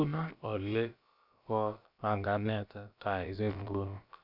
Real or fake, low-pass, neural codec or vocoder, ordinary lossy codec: fake; 5.4 kHz; codec, 16 kHz, about 1 kbps, DyCAST, with the encoder's durations; none